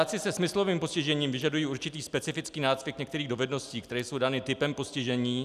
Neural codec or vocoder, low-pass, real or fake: none; 14.4 kHz; real